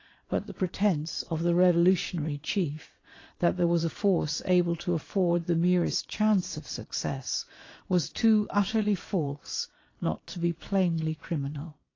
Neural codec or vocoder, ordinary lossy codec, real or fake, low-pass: vocoder, 22.05 kHz, 80 mel bands, Vocos; AAC, 32 kbps; fake; 7.2 kHz